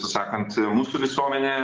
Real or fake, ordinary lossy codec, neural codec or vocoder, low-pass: real; Opus, 16 kbps; none; 7.2 kHz